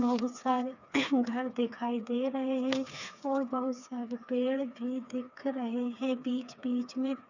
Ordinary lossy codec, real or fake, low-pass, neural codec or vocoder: none; fake; 7.2 kHz; codec, 16 kHz, 4 kbps, FreqCodec, smaller model